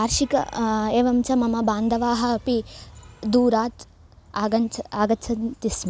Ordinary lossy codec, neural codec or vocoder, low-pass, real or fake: none; none; none; real